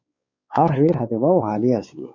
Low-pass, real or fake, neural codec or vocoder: 7.2 kHz; fake; codec, 16 kHz, 4 kbps, X-Codec, WavLM features, trained on Multilingual LibriSpeech